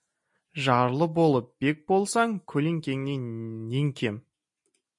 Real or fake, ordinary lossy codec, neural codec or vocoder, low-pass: real; MP3, 48 kbps; none; 10.8 kHz